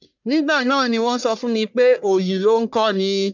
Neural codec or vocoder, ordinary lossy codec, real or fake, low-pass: codec, 44.1 kHz, 1.7 kbps, Pupu-Codec; none; fake; 7.2 kHz